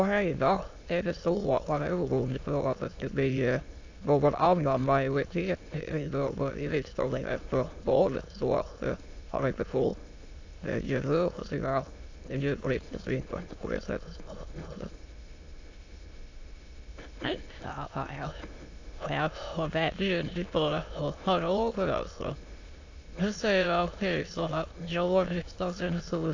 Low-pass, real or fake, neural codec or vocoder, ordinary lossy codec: 7.2 kHz; fake; autoencoder, 22.05 kHz, a latent of 192 numbers a frame, VITS, trained on many speakers; AAC, 48 kbps